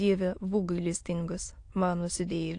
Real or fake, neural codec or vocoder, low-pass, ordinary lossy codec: fake; autoencoder, 22.05 kHz, a latent of 192 numbers a frame, VITS, trained on many speakers; 9.9 kHz; AAC, 48 kbps